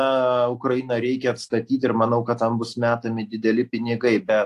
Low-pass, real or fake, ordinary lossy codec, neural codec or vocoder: 14.4 kHz; real; AAC, 64 kbps; none